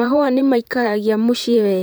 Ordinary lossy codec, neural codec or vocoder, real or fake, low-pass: none; vocoder, 44.1 kHz, 128 mel bands, Pupu-Vocoder; fake; none